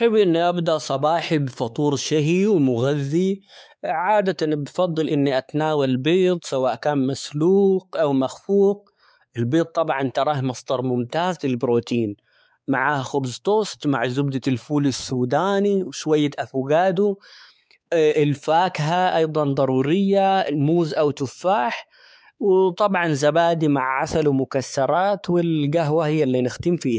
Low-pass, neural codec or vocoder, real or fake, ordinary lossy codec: none; codec, 16 kHz, 4 kbps, X-Codec, WavLM features, trained on Multilingual LibriSpeech; fake; none